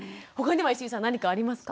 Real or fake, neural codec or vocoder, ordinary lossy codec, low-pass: real; none; none; none